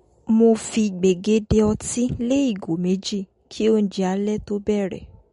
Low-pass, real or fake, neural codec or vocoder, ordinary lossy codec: 10.8 kHz; real; none; MP3, 48 kbps